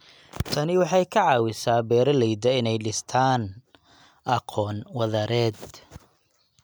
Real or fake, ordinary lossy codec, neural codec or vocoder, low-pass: real; none; none; none